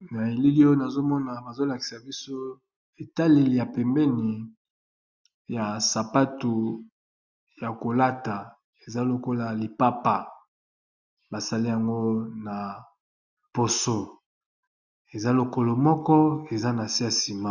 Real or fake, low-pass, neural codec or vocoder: real; 7.2 kHz; none